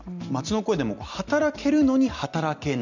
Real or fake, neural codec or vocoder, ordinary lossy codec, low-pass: real; none; none; 7.2 kHz